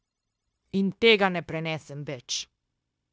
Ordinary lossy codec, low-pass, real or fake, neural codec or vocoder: none; none; fake; codec, 16 kHz, 0.9 kbps, LongCat-Audio-Codec